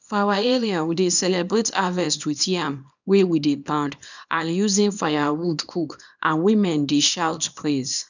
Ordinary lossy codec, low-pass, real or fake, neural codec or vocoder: none; 7.2 kHz; fake; codec, 24 kHz, 0.9 kbps, WavTokenizer, small release